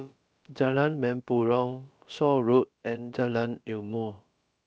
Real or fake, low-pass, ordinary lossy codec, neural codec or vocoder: fake; none; none; codec, 16 kHz, about 1 kbps, DyCAST, with the encoder's durations